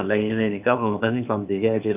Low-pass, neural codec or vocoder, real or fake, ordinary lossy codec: 3.6 kHz; codec, 16 kHz, 0.8 kbps, ZipCodec; fake; none